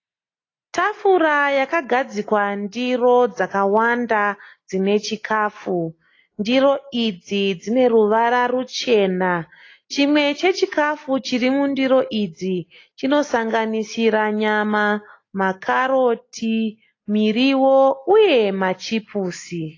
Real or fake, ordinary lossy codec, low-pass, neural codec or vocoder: real; AAC, 32 kbps; 7.2 kHz; none